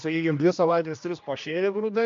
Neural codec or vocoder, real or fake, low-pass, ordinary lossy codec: codec, 16 kHz, 1 kbps, X-Codec, HuBERT features, trained on general audio; fake; 7.2 kHz; MP3, 48 kbps